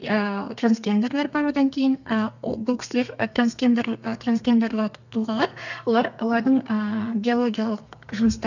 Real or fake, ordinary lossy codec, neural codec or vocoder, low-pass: fake; none; codec, 32 kHz, 1.9 kbps, SNAC; 7.2 kHz